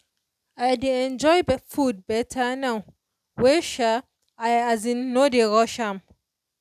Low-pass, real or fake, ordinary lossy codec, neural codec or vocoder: 14.4 kHz; real; none; none